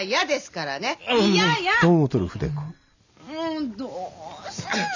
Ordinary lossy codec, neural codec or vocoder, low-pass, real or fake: none; none; 7.2 kHz; real